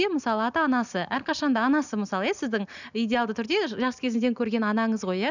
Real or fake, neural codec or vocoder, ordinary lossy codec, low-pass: real; none; none; 7.2 kHz